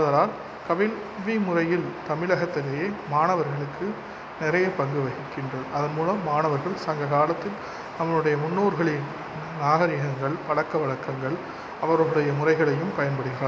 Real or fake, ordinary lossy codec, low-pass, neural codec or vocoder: real; none; none; none